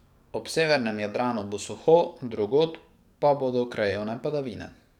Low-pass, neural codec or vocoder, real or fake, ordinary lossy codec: 19.8 kHz; codec, 44.1 kHz, 7.8 kbps, DAC; fake; none